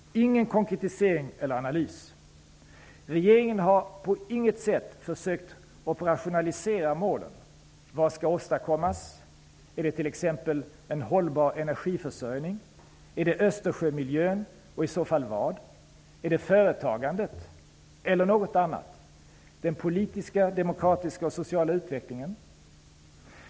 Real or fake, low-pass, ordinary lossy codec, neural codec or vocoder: real; none; none; none